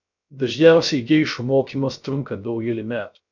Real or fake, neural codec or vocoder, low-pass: fake; codec, 16 kHz, 0.3 kbps, FocalCodec; 7.2 kHz